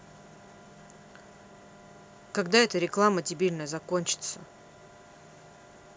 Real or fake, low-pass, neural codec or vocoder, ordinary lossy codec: real; none; none; none